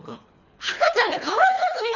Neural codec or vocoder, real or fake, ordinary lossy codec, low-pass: codec, 24 kHz, 3 kbps, HILCodec; fake; none; 7.2 kHz